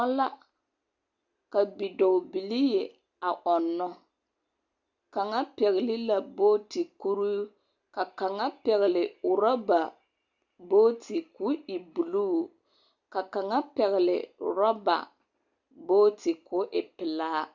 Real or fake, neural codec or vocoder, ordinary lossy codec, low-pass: real; none; Opus, 64 kbps; 7.2 kHz